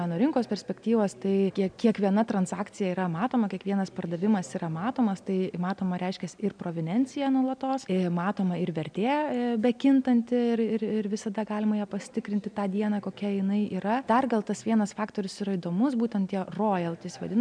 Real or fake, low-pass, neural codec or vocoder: real; 9.9 kHz; none